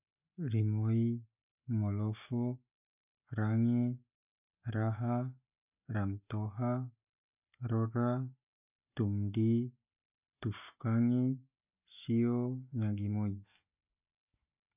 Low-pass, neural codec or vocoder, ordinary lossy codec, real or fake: 3.6 kHz; none; none; real